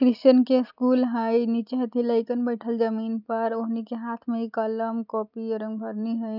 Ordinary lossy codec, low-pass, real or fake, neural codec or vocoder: none; 5.4 kHz; real; none